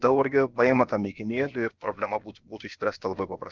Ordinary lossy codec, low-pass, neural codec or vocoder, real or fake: Opus, 24 kbps; 7.2 kHz; codec, 16 kHz, about 1 kbps, DyCAST, with the encoder's durations; fake